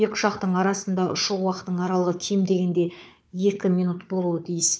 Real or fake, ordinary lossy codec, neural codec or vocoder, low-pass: fake; none; codec, 16 kHz, 4 kbps, FunCodec, trained on Chinese and English, 50 frames a second; none